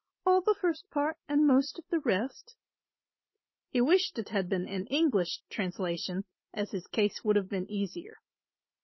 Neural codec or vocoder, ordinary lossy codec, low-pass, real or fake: none; MP3, 24 kbps; 7.2 kHz; real